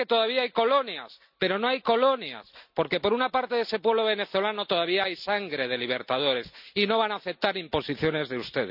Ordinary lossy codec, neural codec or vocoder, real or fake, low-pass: none; none; real; 5.4 kHz